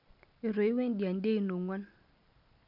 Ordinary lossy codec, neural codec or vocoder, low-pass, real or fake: none; none; 5.4 kHz; real